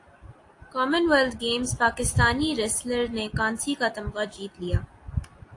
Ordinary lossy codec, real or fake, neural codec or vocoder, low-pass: AAC, 48 kbps; real; none; 10.8 kHz